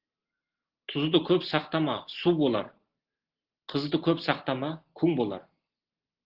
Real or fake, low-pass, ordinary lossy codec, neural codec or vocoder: real; 5.4 kHz; Opus, 16 kbps; none